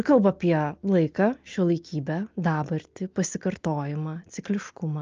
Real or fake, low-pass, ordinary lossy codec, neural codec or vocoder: real; 7.2 kHz; Opus, 24 kbps; none